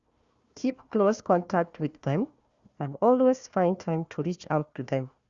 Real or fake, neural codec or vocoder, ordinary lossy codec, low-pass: fake; codec, 16 kHz, 1 kbps, FunCodec, trained on Chinese and English, 50 frames a second; Opus, 64 kbps; 7.2 kHz